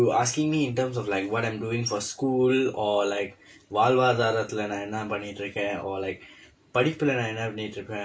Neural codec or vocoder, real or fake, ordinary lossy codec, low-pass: none; real; none; none